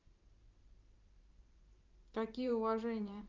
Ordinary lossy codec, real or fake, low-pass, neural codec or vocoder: Opus, 32 kbps; fake; 7.2 kHz; vocoder, 44.1 kHz, 128 mel bands every 512 samples, BigVGAN v2